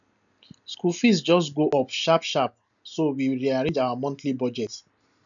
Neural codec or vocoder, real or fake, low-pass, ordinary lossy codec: none; real; 7.2 kHz; none